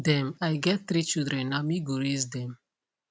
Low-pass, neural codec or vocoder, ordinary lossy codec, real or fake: none; none; none; real